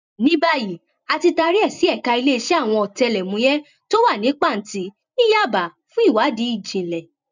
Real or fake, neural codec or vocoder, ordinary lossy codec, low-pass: fake; vocoder, 44.1 kHz, 128 mel bands every 256 samples, BigVGAN v2; none; 7.2 kHz